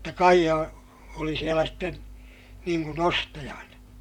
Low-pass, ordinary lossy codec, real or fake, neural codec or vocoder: 19.8 kHz; none; fake; vocoder, 44.1 kHz, 128 mel bands, Pupu-Vocoder